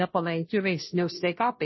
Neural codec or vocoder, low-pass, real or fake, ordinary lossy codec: codec, 16 kHz, 0.5 kbps, FunCodec, trained on Chinese and English, 25 frames a second; 7.2 kHz; fake; MP3, 24 kbps